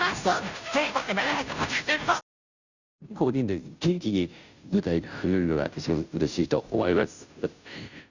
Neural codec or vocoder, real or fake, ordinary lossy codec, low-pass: codec, 16 kHz, 0.5 kbps, FunCodec, trained on Chinese and English, 25 frames a second; fake; none; 7.2 kHz